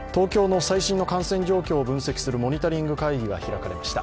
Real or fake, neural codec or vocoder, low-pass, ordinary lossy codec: real; none; none; none